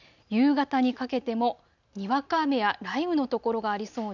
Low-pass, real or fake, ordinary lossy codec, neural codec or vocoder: 7.2 kHz; real; none; none